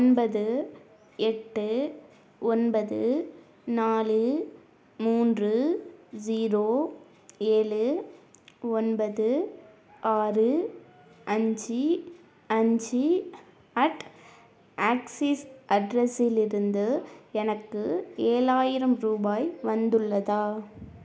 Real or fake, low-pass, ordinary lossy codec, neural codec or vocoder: real; none; none; none